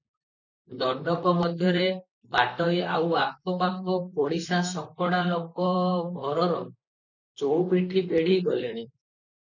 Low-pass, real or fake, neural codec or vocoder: 7.2 kHz; fake; vocoder, 44.1 kHz, 128 mel bands, Pupu-Vocoder